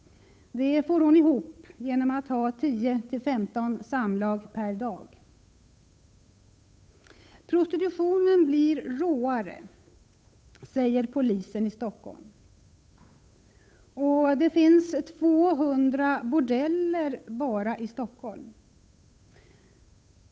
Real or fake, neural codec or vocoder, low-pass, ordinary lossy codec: fake; codec, 16 kHz, 8 kbps, FunCodec, trained on Chinese and English, 25 frames a second; none; none